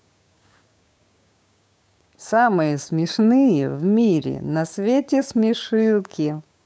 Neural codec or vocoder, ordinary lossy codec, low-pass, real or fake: codec, 16 kHz, 6 kbps, DAC; none; none; fake